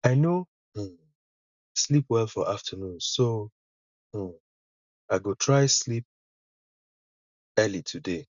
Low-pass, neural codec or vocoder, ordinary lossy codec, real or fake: 7.2 kHz; none; none; real